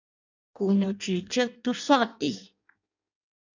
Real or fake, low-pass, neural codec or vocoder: fake; 7.2 kHz; codec, 16 kHz in and 24 kHz out, 0.6 kbps, FireRedTTS-2 codec